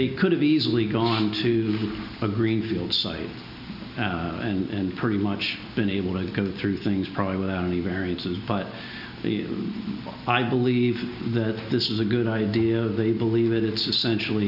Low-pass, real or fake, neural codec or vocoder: 5.4 kHz; real; none